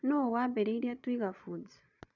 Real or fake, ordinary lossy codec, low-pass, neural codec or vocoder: fake; none; 7.2 kHz; vocoder, 22.05 kHz, 80 mel bands, Vocos